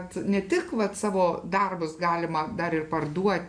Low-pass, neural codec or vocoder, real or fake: 9.9 kHz; none; real